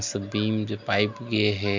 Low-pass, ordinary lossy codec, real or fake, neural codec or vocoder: 7.2 kHz; MP3, 64 kbps; real; none